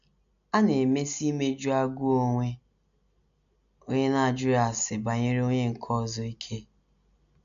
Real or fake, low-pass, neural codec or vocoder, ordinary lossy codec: real; 7.2 kHz; none; none